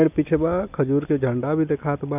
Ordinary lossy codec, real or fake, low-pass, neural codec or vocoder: none; real; 3.6 kHz; none